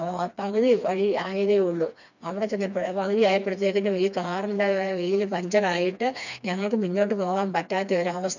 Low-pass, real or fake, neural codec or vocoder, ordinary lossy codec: 7.2 kHz; fake; codec, 16 kHz, 2 kbps, FreqCodec, smaller model; none